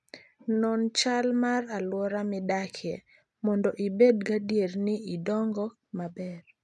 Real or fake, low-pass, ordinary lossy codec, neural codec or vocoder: real; none; none; none